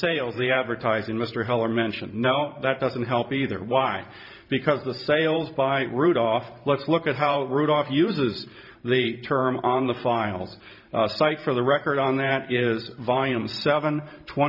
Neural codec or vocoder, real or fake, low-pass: vocoder, 44.1 kHz, 128 mel bands every 512 samples, BigVGAN v2; fake; 5.4 kHz